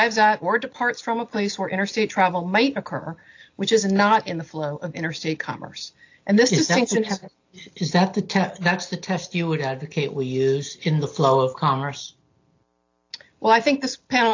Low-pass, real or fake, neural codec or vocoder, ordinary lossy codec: 7.2 kHz; real; none; AAC, 48 kbps